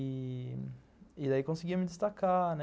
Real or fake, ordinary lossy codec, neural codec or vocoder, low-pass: real; none; none; none